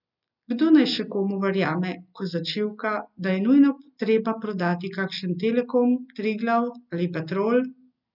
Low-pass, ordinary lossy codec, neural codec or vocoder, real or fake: 5.4 kHz; none; none; real